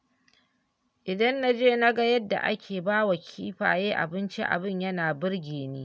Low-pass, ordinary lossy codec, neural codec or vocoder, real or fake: none; none; none; real